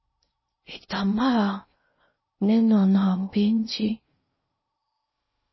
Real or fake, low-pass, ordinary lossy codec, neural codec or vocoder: fake; 7.2 kHz; MP3, 24 kbps; codec, 16 kHz in and 24 kHz out, 0.6 kbps, FocalCodec, streaming, 4096 codes